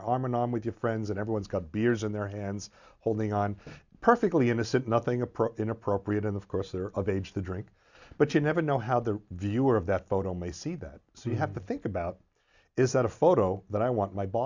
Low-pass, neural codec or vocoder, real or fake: 7.2 kHz; none; real